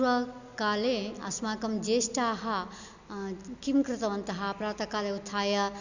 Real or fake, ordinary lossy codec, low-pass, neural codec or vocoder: real; none; 7.2 kHz; none